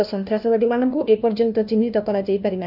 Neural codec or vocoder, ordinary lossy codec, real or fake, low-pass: codec, 16 kHz, 1 kbps, FunCodec, trained on LibriTTS, 50 frames a second; none; fake; 5.4 kHz